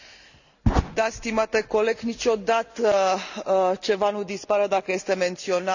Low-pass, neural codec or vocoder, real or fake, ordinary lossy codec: 7.2 kHz; none; real; none